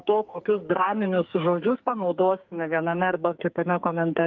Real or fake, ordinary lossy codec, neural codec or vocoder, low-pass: fake; Opus, 24 kbps; codec, 44.1 kHz, 2.6 kbps, SNAC; 7.2 kHz